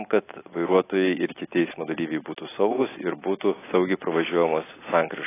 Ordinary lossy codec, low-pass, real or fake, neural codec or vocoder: AAC, 16 kbps; 3.6 kHz; real; none